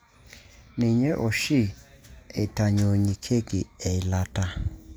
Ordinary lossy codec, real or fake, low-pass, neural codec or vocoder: none; real; none; none